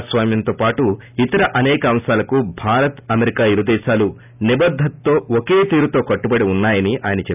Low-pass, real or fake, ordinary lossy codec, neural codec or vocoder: 3.6 kHz; real; none; none